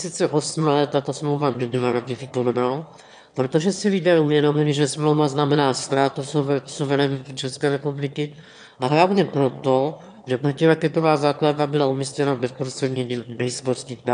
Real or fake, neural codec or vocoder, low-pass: fake; autoencoder, 22.05 kHz, a latent of 192 numbers a frame, VITS, trained on one speaker; 9.9 kHz